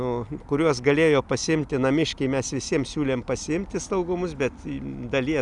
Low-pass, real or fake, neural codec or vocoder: 10.8 kHz; real; none